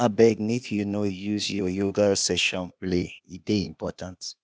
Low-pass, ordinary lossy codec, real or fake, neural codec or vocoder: none; none; fake; codec, 16 kHz, 0.8 kbps, ZipCodec